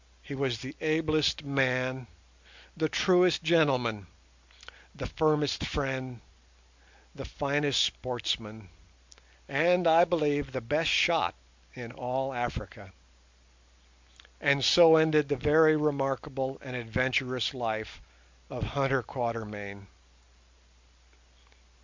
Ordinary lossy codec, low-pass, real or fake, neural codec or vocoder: MP3, 64 kbps; 7.2 kHz; real; none